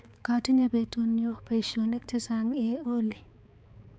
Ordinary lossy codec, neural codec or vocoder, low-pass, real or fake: none; codec, 16 kHz, 4 kbps, X-Codec, HuBERT features, trained on balanced general audio; none; fake